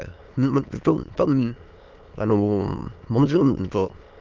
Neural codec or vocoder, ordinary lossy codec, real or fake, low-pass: autoencoder, 22.05 kHz, a latent of 192 numbers a frame, VITS, trained on many speakers; Opus, 24 kbps; fake; 7.2 kHz